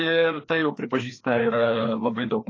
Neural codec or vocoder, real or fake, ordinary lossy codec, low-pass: codec, 16 kHz, 2 kbps, FreqCodec, larger model; fake; AAC, 32 kbps; 7.2 kHz